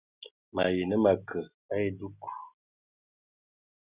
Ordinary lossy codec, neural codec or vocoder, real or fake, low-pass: Opus, 64 kbps; none; real; 3.6 kHz